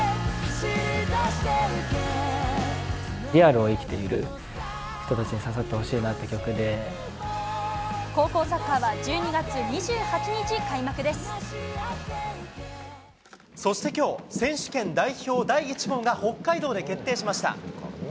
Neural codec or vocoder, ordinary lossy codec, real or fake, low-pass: none; none; real; none